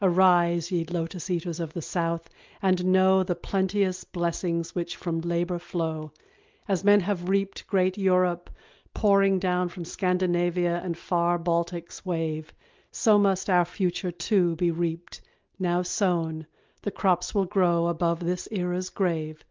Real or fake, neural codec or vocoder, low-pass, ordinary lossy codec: real; none; 7.2 kHz; Opus, 32 kbps